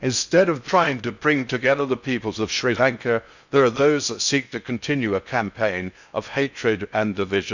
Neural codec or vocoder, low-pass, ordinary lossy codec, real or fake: codec, 16 kHz in and 24 kHz out, 0.6 kbps, FocalCodec, streaming, 4096 codes; 7.2 kHz; none; fake